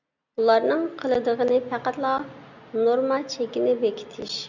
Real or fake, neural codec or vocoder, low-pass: real; none; 7.2 kHz